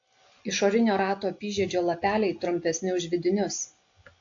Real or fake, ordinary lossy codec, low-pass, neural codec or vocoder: real; AAC, 48 kbps; 7.2 kHz; none